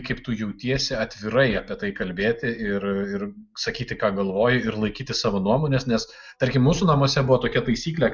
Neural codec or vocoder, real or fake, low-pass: none; real; 7.2 kHz